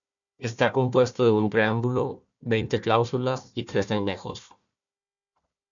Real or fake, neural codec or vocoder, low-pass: fake; codec, 16 kHz, 1 kbps, FunCodec, trained on Chinese and English, 50 frames a second; 7.2 kHz